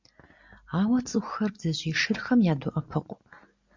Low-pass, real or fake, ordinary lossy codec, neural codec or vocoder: 7.2 kHz; real; MP3, 64 kbps; none